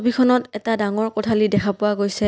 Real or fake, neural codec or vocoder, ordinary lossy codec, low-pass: real; none; none; none